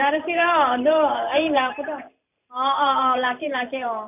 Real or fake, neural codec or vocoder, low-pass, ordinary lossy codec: fake; vocoder, 44.1 kHz, 128 mel bands every 512 samples, BigVGAN v2; 3.6 kHz; none